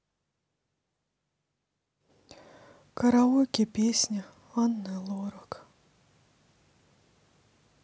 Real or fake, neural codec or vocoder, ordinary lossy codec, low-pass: real; none; none; none